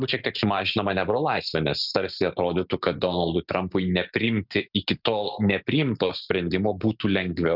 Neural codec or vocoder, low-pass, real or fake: none; 5.4 kHz; real